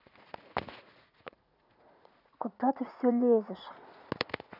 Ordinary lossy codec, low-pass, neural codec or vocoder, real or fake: none; 5.4 kHz; vocoder, 44.1 kHz, 80 mel bands, Vocos; fake